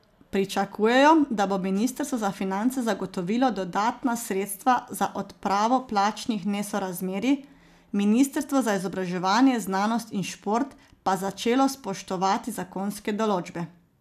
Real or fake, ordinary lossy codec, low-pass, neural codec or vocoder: real; none; 14.4 kHz; none